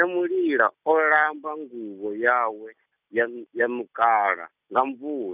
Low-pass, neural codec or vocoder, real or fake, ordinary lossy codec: 3.6 kHz; none; real; none